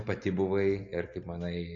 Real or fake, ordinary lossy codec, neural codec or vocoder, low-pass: real; AAC, 64 kbps; none; 7.2 kHz